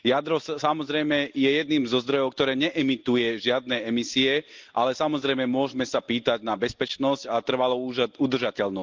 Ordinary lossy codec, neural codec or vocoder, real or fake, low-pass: Opus, 32 kbps; none; real; 7.2 kHz